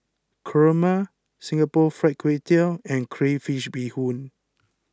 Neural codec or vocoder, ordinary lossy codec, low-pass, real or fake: none; none; none; real